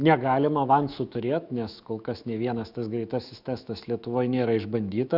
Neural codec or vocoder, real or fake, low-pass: autoencoder, 48 kHz, 128 numbers a frame, DAC-VAE, trained on Japanese speech; fake; 5.4 kHz